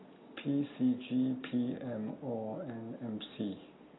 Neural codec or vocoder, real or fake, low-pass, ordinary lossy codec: none; real; 7.2 kHz; AAC, 16 kbps